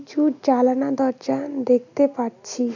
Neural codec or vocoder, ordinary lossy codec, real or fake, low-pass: none; none; real; 7.2 kHz